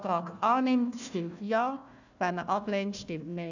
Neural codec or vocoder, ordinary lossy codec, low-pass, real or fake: codec, 16 kHz, 1 kbps, FunCodec, trained on Chinese and English, 50 frames a second; none; 7.2 kHz; fake